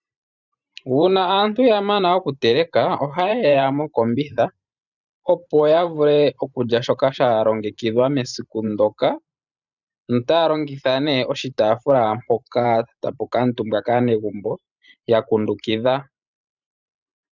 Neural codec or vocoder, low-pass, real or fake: vocoder, 44.1 kHz, 128 mel bands every 512 samples, BigVGAN v2; 7.2 kHz; fake